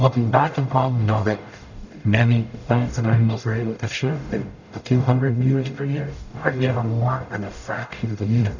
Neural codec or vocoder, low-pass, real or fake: codec, 44.1 kHz, 0.9 kbps, DAC; 7.2 kHz; fake